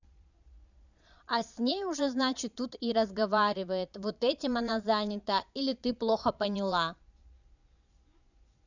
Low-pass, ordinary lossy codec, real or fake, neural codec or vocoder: 7.2 kHz; none; fake; vocoder, 22.05 kHz, 80 mel bands, Vocos